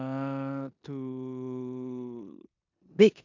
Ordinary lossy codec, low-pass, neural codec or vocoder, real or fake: AAC, 48 kbps; 7.2 kHz; codec, 16 kHz in and 24 kHz out, 0.9 kbps, LongCat-Audio-Codec, four codebook decoder; fake